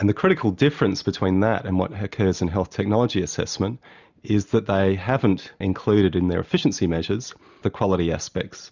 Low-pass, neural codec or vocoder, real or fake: 7.2 kHz; none; real